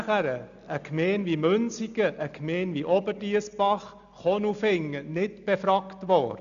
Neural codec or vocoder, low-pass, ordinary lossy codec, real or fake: none; 7.2 kHz; none; real